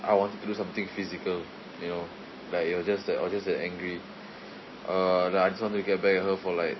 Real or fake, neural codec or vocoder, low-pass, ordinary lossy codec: real; none; 7.2 kHz; MP3, 24 kbps